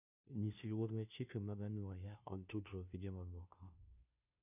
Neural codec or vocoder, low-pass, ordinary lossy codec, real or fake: codec, 16 kHz, 0.5 kbps, FunCodec, trained on Chinese and English, 25 frames a second; 3.6 kHz; none; fake